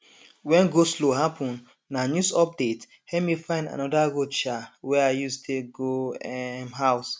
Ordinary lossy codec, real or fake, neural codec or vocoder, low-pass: none; real; none; none